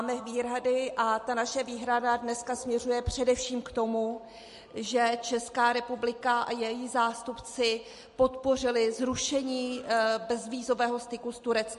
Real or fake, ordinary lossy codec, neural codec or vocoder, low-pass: real; MP3, 48 kbps; none; 14.4 kHz